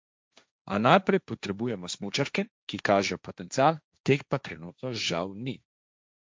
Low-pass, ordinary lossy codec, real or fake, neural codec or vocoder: none; none; fake; codec, 16 kHz, 1.1 kbps, Voila-Tokenizer